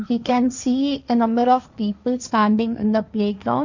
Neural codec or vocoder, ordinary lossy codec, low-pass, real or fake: codec, 16 kHz, 1.1 kbps, Voila-Tokenizer; none; 7.2 kHz; fake